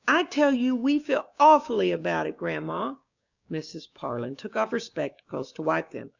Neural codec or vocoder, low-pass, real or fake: codec, 16 kHz, 6 kbps, DAC; 7.2 kHz; fake